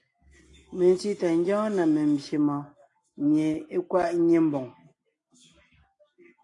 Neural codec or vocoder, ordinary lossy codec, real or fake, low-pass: none; AAC, 48 kbps; real; 10.8 kHz